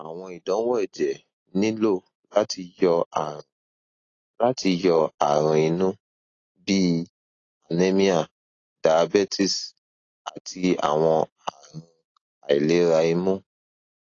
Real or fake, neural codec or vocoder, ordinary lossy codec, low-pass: real; none; AAC, 32 kbps; 7.2 kHz